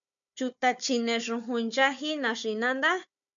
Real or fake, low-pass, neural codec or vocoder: fake; 7.2 kHz; codec, 16 kHz, 4 kbps, FunCodec, trained on Chinese and English, 50 frames a second